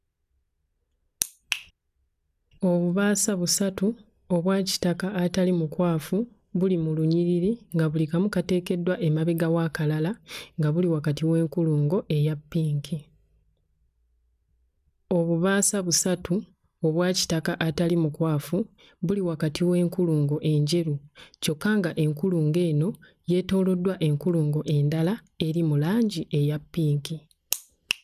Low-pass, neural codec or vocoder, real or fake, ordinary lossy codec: 14.4 kHz; none; real; none